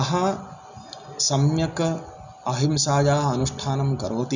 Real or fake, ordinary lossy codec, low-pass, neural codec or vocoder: real; none; 7.2 kHz; none